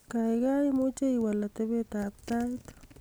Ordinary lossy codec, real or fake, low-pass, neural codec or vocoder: none; real; none; none